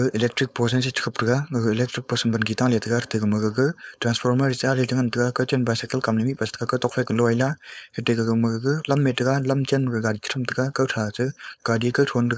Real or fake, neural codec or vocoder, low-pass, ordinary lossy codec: fake; codec, 16 kHz, 4.8 kbps, FACodec; none; none